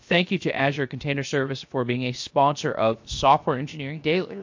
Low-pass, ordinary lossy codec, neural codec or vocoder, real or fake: 7.2 kHz; MP3, 48 kbps; codec, 16 kHz, 0.7 kbps, FocalCodec; fake